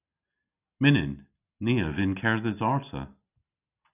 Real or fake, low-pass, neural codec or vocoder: real; 3.6 kHz; none